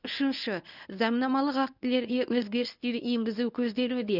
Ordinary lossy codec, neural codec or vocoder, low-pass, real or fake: none; codec, 24 kHz, 0.9 kbps, WavTokenizer, medium speech release version 1; 5.4 kHz; fake